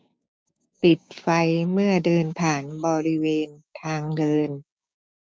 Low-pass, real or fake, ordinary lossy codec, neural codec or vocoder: none; fake; none; codec, 16 kHz, 6 kbps, DAC